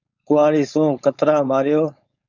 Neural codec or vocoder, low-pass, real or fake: codec, 16 kHz, 4.8 kbps, FACodec; 7.2 kHz; fake